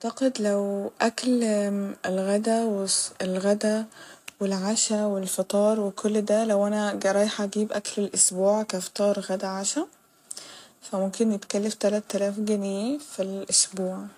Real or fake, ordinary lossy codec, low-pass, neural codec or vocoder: real; AAC, 64 kbps; 14.4 kHz; none